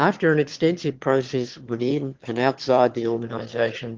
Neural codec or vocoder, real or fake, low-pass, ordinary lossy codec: autoencoder, 22.05 kHz, a latent of 192 numbers a frame, VITS, trained on one speaker; fake; 7.2 kHz; Opus, 16 kbps